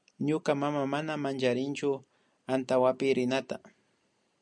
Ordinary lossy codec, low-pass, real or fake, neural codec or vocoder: MP3, 96 kbps; 9.9 kHz; real; none